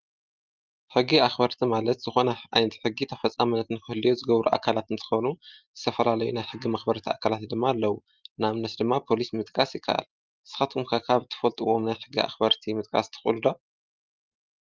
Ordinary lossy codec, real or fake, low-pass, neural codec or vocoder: Opus, 24 kbps; real; 7.2 kHz; none